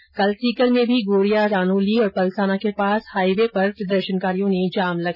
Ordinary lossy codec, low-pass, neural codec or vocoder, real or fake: none; 5.4 kHz; none; real